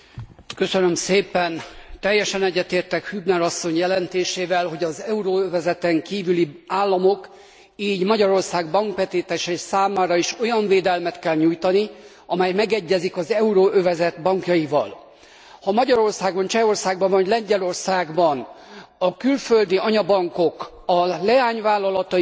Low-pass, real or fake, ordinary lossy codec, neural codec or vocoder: none; real; none; none